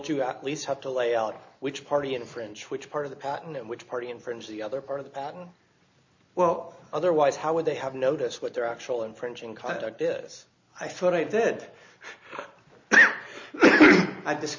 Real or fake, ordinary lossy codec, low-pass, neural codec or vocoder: real; MP3, 48 kbps; 7.2 kHz; none